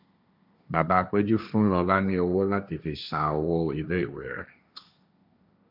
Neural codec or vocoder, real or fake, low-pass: codec, 16 kHz, 1.1 kbps, Voila-Tokenizer; fake; 5.4 kHz